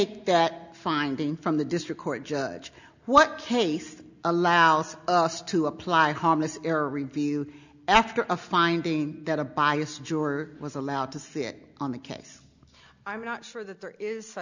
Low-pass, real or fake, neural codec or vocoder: 7.2 kHz; real; none